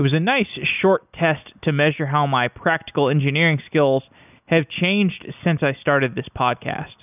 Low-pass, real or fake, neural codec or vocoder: 3.6 kHz; real; none